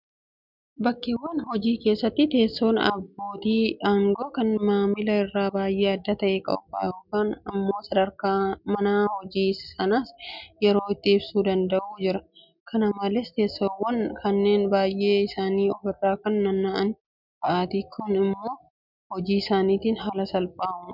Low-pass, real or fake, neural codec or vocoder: 5.4 kHz; real; none